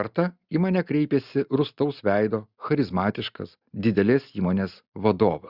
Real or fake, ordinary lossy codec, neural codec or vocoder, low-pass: real; Opus, 64 kbps; none; 5.4 kHz